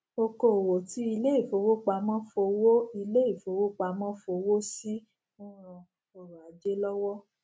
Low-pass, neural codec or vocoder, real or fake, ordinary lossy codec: none; none; real; none